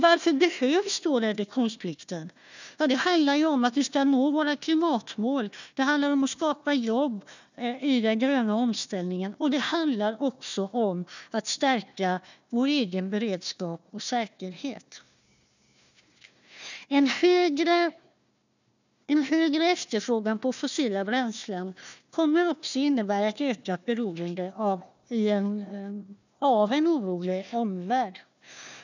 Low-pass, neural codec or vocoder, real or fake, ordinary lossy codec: 7.2 kHz; codec, 16 kHz, 1 kbps, FunCodec, trained on Chinese and English, 50 frames a second; fake; none